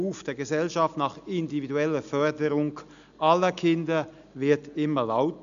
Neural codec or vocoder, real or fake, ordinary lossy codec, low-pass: none; real; none; 7.2 kHz